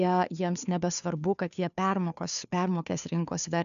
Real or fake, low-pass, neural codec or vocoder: fake; 7.2 kHz; codec, 16 kHz, 2 kbps, FunCodec, trained on Chinese and English, 25 frames a second